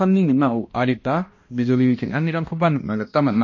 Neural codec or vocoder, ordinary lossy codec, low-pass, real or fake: codec, 16 kHz, 1 kbps, X-Codec, HuBERT features, trained on balanced general audio; MP3, 32 kbps; 7.2 kHz; fake